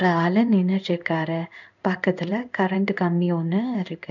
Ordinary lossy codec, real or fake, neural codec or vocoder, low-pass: none; fake; codec, 16 kHz in and 24 kHz out, 1 kbps, XY-Tokenizer; 7.2 kHz